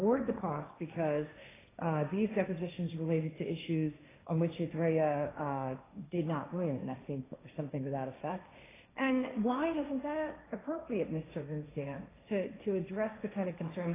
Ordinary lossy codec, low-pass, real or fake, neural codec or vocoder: AAC, 16 kbps; 3.6 kHz; fake; codec, 16 kHz, 1.1 kbps, Voila-Tokenizer